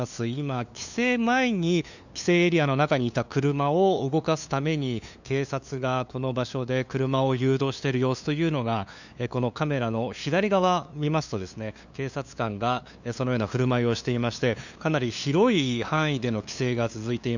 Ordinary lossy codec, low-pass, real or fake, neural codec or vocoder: none; 7.2 kHz; fake; codec, 16 kHz, 2 kbps, FunCodec, trained on LibriTTS, 25 frames a second